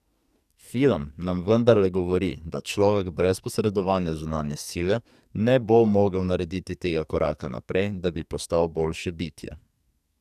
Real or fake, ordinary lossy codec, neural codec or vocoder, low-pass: fake; none; codec, 44.1 kHz, 2.6 kbps, SNAC; 14.4 kHz